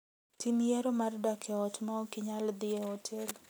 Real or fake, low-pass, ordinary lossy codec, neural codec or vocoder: real; none; none; none